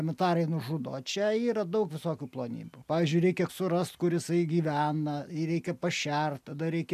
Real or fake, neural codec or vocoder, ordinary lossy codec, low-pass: real; none; AAC, 96 kbps; 14.4 kHz